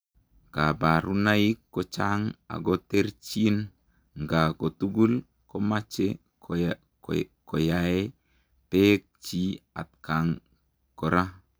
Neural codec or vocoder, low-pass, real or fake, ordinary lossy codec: none; none; real; none